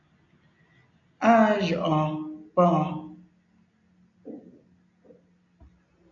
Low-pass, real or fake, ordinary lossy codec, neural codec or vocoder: 7.2 kHz; real; MP3, 96 kbps; none